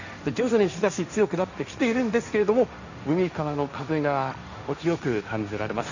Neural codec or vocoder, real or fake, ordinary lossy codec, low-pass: codec, 16 kHz, 1.1 kbps, Voila-Tokenizer; fake; none; 7.2 kHz